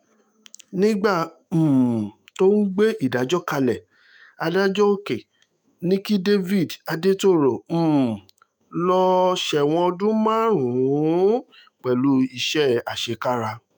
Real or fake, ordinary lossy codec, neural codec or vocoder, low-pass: fake; none; autoencoder, 48 kHz, 128 numbers a frame, DAC-VAE, trained on Japanese speech; none